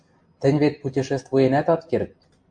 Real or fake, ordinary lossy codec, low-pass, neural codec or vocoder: real; MP3, 64 kbps; 9.9 kHz; none